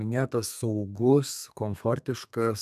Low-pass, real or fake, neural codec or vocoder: 14.4 kHz; fake; codec, 32 kHz, 1.9 kbps, SNAC